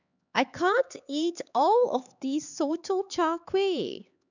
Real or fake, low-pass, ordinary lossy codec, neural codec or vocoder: fake; 7.2 kHz; none; codec, 16 kHz, 4 kbps, X-Codec, HuBERT features, trained on LibriSpeech